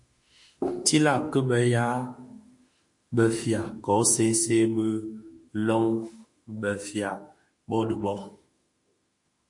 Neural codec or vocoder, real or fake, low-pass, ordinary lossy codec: autoencoder, 48 kHz, 32 numbers a frame, DAC-VAE, trained on Japanese speech; fake; 10.8 kHz; MP3, 48 kbps